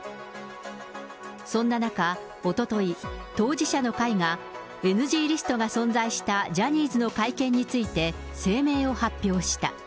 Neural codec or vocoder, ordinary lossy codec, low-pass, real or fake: none; none; none; real